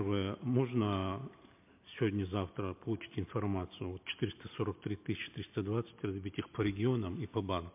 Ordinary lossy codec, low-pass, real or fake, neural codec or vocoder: MP3, 32 kbps; 3.6 kHz; real; none